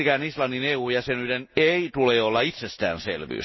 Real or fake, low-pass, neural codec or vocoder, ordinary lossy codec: real; 7.2 kHz; none; MP3, 24 kbps